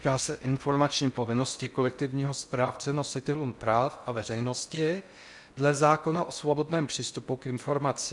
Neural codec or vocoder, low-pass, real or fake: codec, 16 kHz in and 24 kHz out, 0.6 kbps, FocalCodec, streaming, 4096 codes; 10.8 kHz; fake